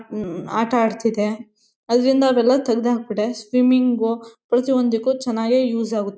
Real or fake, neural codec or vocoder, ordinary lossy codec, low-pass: real; none; none; none